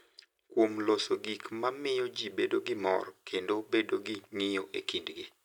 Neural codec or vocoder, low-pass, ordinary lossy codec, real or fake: none; 19.8 kHz; none; real